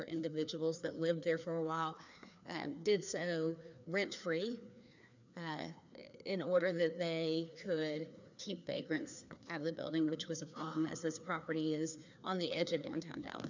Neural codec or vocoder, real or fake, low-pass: codec, 16 kHz, 2 kbps, FreqCodec, larger model; fake; 7.2 kHz